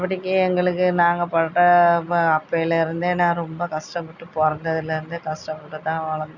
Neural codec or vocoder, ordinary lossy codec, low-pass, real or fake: none; none; 7.2 kHz; real